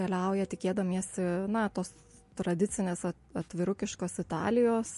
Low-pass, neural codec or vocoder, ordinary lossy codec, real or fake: 14.4 kHz; none; MP3, 48 kbps; real